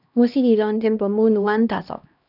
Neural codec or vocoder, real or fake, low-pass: codec, 16 kHz, 1 kbps, X-Codec, HuBERT features, trained on LibriSpeech; fake; 5.4 kHz